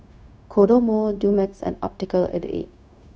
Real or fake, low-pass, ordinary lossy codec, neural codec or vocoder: fake; none; none; codec, 16 kHz, 0.4 kbps, LongCat-Audio-Codec